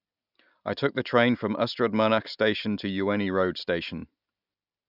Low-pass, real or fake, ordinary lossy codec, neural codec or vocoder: 5.4 kHz; real; none; none